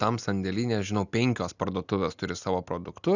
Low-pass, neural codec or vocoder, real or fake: 7.2 kHz; none; real